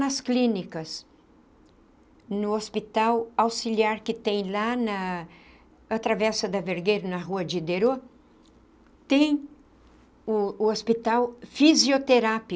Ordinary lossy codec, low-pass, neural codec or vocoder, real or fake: none; none; none; real